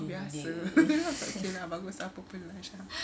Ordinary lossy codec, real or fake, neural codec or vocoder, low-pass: none; real; none; none